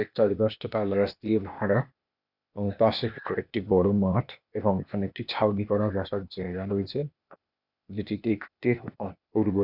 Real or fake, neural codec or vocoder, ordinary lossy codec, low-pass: fake; codec, 16 kHz, 0.8 kbps, ZipCodec; none; 5.4 kHz